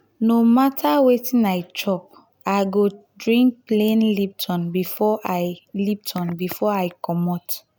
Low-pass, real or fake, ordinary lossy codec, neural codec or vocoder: none; real; none; none